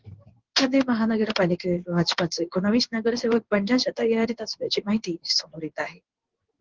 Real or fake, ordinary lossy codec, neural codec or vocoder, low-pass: fake; Opus, 16 kbps; codec, 16 kHz in and 24 kHz out, 1 kbps, XY-Tokenizer; 7.2 kHz